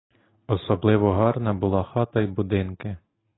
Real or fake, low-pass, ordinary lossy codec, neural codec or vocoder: real; 7.2 kHz; AAC, 16 kbps; none